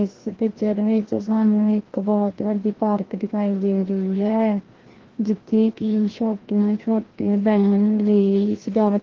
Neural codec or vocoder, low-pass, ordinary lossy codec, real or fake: codec, 16 kHz, 1 kbps, FreqCodec, larger model; 7.2 kHz; Opus, 16 kbps; fake